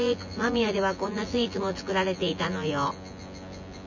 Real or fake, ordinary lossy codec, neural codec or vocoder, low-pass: fake; none; vocoder, 24 kHz, 100 mel bands, Vocos; 7.2 kHz